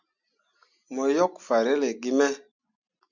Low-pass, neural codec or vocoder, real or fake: 7.2 kHz; none; real